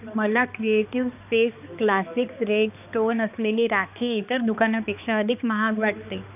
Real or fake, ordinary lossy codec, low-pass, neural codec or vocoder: fake; none; 3.6 kHz; codec, 16 kHz, 2 kbps, X-Codec, HuBERT features, trained on balanced general audio